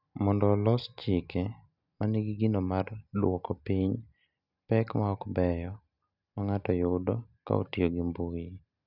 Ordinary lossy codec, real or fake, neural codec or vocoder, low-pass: none; real; none; 5.4 kHz